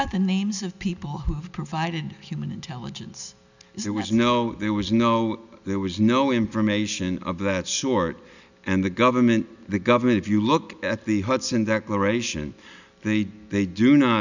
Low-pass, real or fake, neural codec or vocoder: 7.2 kHz; real; none